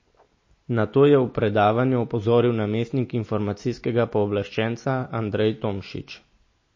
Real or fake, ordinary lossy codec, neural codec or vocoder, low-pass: real; MP3, 32 kbps; none; 7.2 kHz